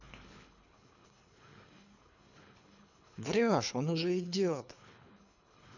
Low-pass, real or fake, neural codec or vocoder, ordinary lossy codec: 7.2 kHz; fake; codec, 24 kHz, 3 kbps, HILCodec; none